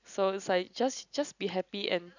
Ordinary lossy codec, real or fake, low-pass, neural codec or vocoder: none; real; 7.2 kHz; none